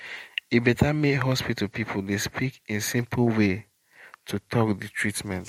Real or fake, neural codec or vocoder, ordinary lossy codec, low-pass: fake; vocoder, 44.1 kHz, 128 mel bands every 512 samples, BigVGAN v2; MP3, 64 kbps; 19.8 kHz